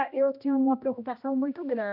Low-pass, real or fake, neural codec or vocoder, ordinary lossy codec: 5.4 kHz; fake; codec, 16 kHz, 1 kbps, X-Codec, HuBERT features, trained on general audio; none